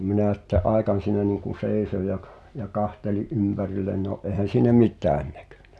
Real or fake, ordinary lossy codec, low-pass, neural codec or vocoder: real; none; none; none